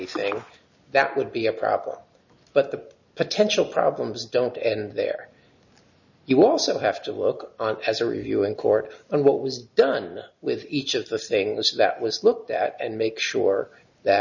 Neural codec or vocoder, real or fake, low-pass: none; real; 7.2 kHz